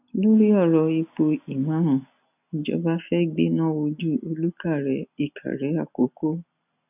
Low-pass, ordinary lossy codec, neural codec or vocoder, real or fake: 3.6 kHz; none; none; real